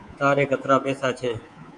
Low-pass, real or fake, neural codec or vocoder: 10.8 kHz; fake; codec, 24 kHz, 3.1 kbps, DualCodec